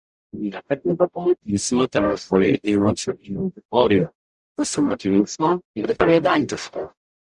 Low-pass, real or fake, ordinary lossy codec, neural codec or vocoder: 10.8 kHz; fake; Opus, 64 kbps; codec, 44.1 kHz, 0.9 kbps, DAC